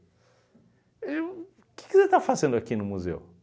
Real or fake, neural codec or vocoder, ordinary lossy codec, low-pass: real; none; none; none